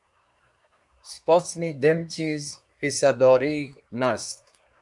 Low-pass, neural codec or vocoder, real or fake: 10.8 kHz; codec, 24 kHz, 1 kbps, SNAC; fake